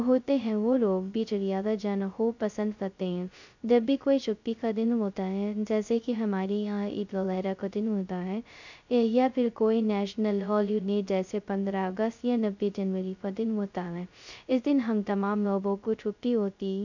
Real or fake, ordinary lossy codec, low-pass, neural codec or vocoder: fake; none; 7.2 kHz; codec, 16 kHz, 0.2 kbps, FocalCodec